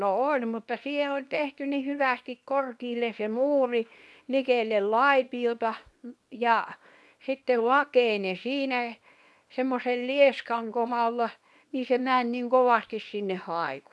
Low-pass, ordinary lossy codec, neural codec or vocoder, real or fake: none; none; codec, 24 kHz, 0.9 kbps, WavTokenizer, small release; fake